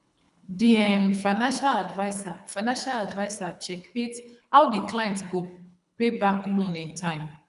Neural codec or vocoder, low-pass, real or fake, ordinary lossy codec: codec, 24 kHz, 3 kbps, HILCodec; 10.8 kHz; fake; none